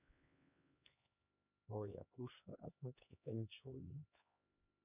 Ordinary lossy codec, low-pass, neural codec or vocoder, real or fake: none; 3.6 kHz; codec, 16 kHz, 2 kbps, X-Codec, HuBERT features, trained on LibriSpeech; fake